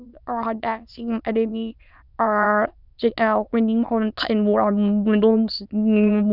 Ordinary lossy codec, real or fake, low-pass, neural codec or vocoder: none; fake; 5.4 kHz; autoencoder, 22.05 kHz, a latent of 192 numbers a frame, VITS, trained on many speakers